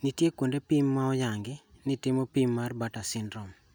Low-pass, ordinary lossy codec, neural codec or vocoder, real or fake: none; none; none; real